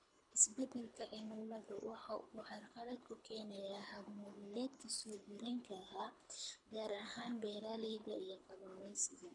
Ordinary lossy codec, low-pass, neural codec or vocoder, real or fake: none; 10.8 kHz; codec, 24 kHz, 3 kbps, HILCodec; fake